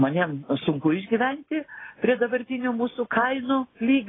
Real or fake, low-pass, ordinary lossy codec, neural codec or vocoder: real; 7.2 kHz; AAC, 16 kbps; none